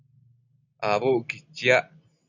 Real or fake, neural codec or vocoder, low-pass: real; none; 7.2 kHz